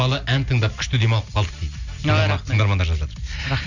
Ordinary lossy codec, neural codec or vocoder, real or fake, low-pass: none; none; real; 7.2 kHz